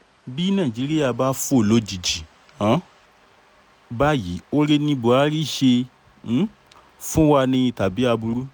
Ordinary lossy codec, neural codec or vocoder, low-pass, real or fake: none; none; none; real